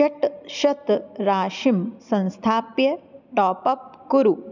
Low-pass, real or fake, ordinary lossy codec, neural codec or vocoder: 7.2 kHz; real; none; none